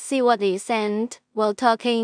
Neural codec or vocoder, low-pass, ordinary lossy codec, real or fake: codec, 16 kHz in and 24 kHz out, 0.4 kbps, LongCat-Audio-Codec, two codebook decoder; 9.9 kHz; none; fake